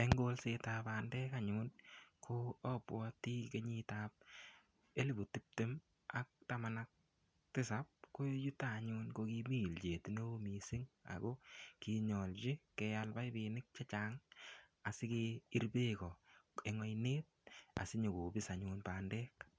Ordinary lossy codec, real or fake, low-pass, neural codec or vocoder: none; real; none; none